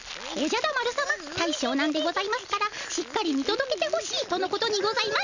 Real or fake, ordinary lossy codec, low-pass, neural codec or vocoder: real; none; 7.2 kHz; none